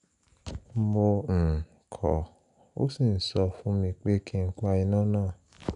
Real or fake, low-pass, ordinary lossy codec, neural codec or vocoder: real; 10.8 kHz; none; none